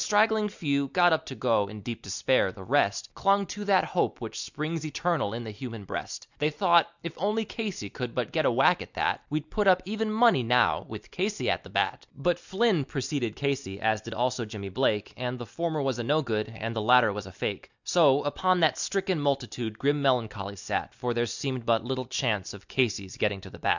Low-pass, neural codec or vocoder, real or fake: 7.2 kHz; none; real